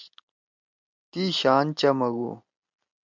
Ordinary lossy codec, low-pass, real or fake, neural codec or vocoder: MP3, 64 kbps; 7.2 kHz; real; none